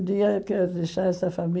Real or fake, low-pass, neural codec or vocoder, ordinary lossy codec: real; none; none; none